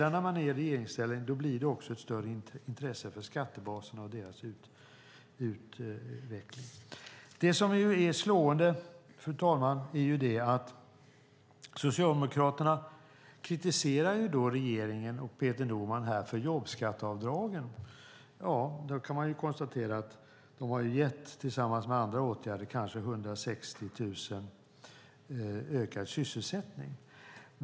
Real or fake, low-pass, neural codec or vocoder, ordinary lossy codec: real; none; none; none